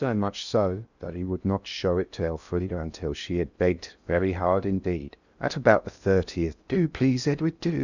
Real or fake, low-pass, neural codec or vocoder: fake; 7.2 kHz; codec, 16 kHz in and 24 kHz out, 0.6 kbps, FocalCodec, streaming, 2048 codes